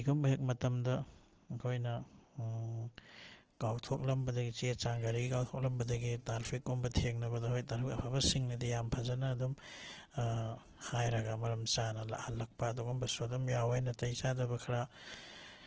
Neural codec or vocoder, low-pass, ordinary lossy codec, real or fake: none; 7.2 kHz; Opus, 16 kbps; real